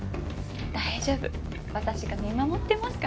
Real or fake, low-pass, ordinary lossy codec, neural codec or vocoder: real; none; none; none